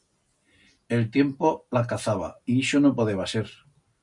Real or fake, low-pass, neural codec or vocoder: real; 10.8 kHz; none